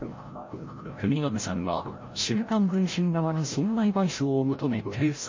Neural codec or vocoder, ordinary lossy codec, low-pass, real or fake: codec, 16 kHz, 0.5 kbps, FreqCodec, larger model; MP3, 32 kbps; 7.2 kHz; fake